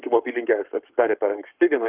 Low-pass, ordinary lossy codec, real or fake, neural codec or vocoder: 3.6 kHz; Opus, 32 kbps; fake; codec, 44.1 kHz, 7.8 kbps, Pupu-Codec